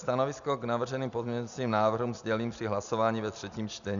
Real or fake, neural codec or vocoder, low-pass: real; none; 7.2 kHz